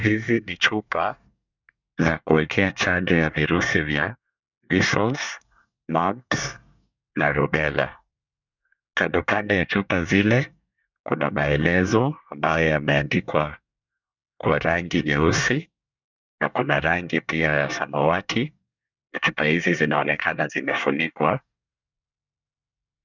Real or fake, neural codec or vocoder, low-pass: fake; codec, 24 kHz, 1 kbps, SNAC; 7.2 kHz